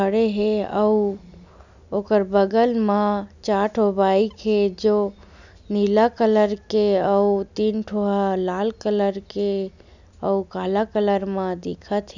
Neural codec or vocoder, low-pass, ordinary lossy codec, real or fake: none; 7.2 kHz; none; real